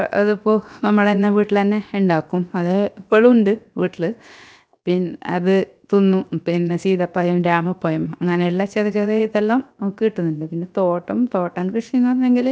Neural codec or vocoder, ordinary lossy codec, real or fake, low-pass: codec, 16 kHz, 0.7 kbps, FocalCodec; none; fake; none